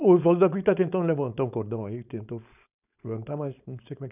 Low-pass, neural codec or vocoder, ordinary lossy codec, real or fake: 3.6 kHz; codec, 16 kHz, 4.8 kbps, FACodec; none; fake